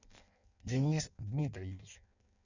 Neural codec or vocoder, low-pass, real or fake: codec, 16 kHz in and 24 kHz out, 0.6 kbps, FireRedTTS-2 codec; 7.2 kHz; fake